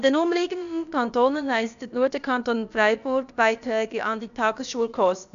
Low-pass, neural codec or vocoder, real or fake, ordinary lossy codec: 7.2 kHz; codec, 16 kHz, about 1 kbps, DyCAST, with the encoder's durations; fake; none